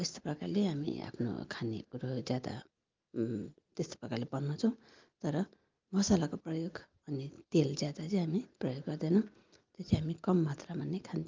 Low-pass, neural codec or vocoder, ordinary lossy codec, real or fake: 7.2 kHz; none; Opus, 24 kbps; real